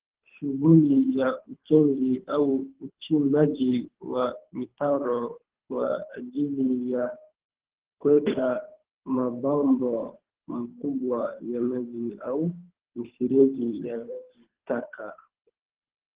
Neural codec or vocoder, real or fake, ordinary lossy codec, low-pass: codec, 24 kHz, 3 kbps, HILCodec; fake; Opus, 16 kbps; 3.6 kHz